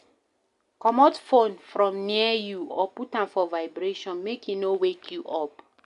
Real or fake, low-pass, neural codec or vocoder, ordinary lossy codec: real; 10.8 kHz; none; AAC, 96 kbps